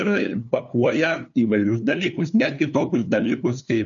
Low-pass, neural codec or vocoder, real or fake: 7.2 kHz; codec, 16 kHz, 2 kbps, FunCodec, trained on LibriTTS, 25 frames a second; fake